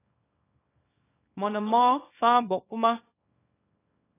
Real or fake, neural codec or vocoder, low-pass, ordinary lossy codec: fake; codec, 24 kHz, 0.9 kbps, WavTokenizer, small release; 3.6 kHz; AAC, 16 kbps